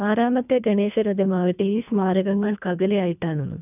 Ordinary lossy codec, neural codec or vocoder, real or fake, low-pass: none; codec, 24 kHz, 1.5 kbps, HILCodec; fake; 3.6 kHz